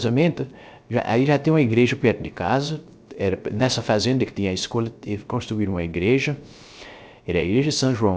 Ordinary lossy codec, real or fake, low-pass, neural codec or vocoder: none; fake; none; codec, 16 kHz, 0.3 kbps, FocalCodec